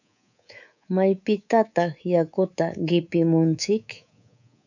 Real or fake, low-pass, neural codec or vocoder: fake; 7.2 kHz; codec, 24 kHz, 3.1 kbps, DualCodec